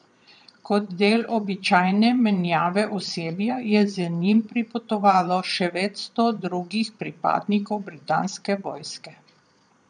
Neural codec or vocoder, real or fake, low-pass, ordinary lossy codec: vocoder, 22.05 kHz, 80 mel bands, Vocos; fake; 9.9 kHz; none